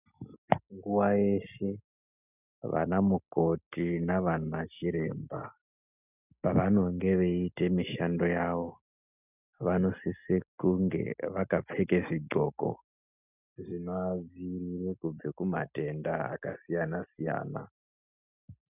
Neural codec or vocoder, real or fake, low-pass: none; real; 3.6 kHz